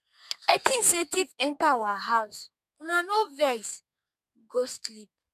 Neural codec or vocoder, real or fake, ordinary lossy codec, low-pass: codec, 32 kHz, 1.9 kbps, SNAC; fake; none; 14.4 kHz